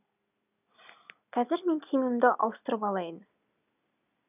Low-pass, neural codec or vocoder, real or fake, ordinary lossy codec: 3.6 kHz; none; real; none